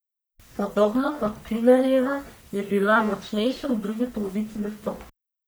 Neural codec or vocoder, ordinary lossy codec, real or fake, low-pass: codec, 44.1 kHz, 1.7 kbps, Pupu-Codec; none; fake; none